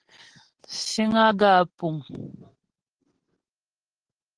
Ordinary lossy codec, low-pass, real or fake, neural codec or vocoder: Opus, 16 kbps; 9.9 kHz; real; none